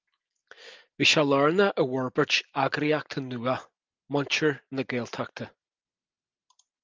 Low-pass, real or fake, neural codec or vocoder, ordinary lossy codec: 7.2 kHz; real; none; Opus, 24 kbps